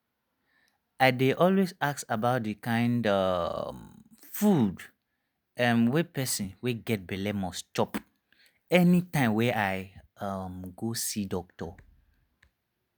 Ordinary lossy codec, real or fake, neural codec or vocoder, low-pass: none; real; none; none